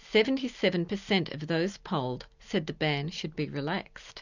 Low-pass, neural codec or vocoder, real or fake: 7.2 kHz; none; real